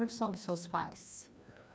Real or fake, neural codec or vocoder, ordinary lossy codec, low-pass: fake; codec, 16 kHz, 1 kbps, FreqCodec, larger model; none; none